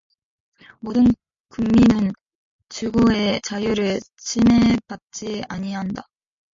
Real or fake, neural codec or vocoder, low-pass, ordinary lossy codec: real; none; 7.2 kHz; MP3, 48 kbps